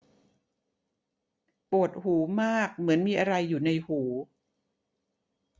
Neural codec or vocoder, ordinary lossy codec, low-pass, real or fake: none; none; none; real